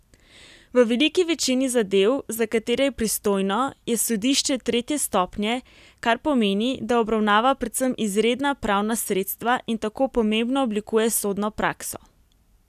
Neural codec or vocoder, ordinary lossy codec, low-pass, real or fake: none; none; 14.4 kHz; real